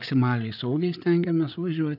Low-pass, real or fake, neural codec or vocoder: 5.4 kHz; fake; codec, 16 kHz, 8 kbps, FreqCodec, larger model